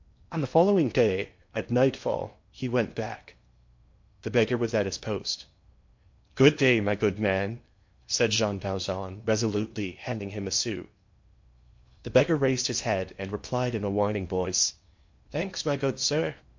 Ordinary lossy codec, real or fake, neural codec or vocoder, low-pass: MP3, 48 kbps; fake; codec, 16 kHz in and 24 kHz out, 0.8 kbps, FocalCodec, streaming, 65536 codes; 7.2 kHz